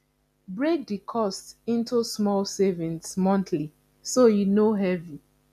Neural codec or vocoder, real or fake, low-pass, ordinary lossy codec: none; real; 14.4 kHz; none